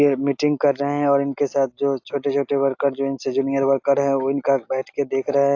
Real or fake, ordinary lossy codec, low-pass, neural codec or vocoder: real; AAC, 48 kbps; 7.2 kHz; none